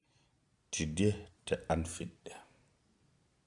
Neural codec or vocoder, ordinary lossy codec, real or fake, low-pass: none; none; real; 10.8 kHz